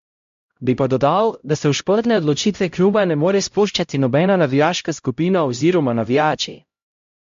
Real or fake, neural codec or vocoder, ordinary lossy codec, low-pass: fake; codec, 16 kHz, 0.5 kbps, X-Codec, HuBERT features, trained on LibriSpeech; MP3, 48 kbps; 7.2 kHz